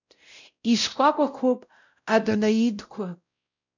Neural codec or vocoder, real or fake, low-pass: codec, 16 kHz, 0.5 kbps, X-Codec, WavLM features, trained on Multilingual LibriSpeech; fake; 7.2 kHz